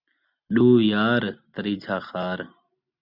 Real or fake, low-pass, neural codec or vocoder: real; 5.4 kHz; none